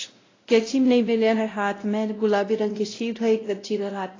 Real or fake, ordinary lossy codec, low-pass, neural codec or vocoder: fake; AAC, 32 kbps; 7.2 kHz; codec, 16 kHz, 0.5 kbps, X-Codec, WavLM features, trained on Multilingual LibriSpeech